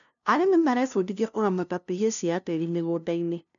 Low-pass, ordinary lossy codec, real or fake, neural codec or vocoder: 7.2 kHz; none; fake; codec, 16 kHz, 0.5 kbps, FunCodec, trained on LibriTTS, 25 frames a second